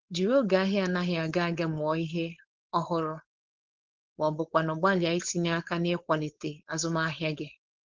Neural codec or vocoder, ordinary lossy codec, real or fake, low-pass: codec, 16 kHz, 4.8 kbps, FACodec; Opus, 16 kbps; fake; 7.2 kHz